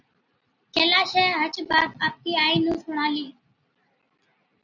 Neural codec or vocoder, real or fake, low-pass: none; real; 7.2 kHz